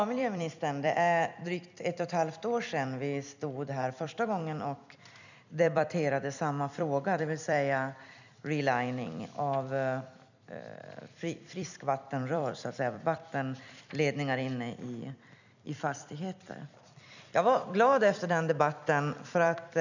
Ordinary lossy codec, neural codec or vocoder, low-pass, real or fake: none; vocoder, 44.1 kHz, 128 mel bands every 256 samples, BigVGAN v2; 7.2 kHz; fake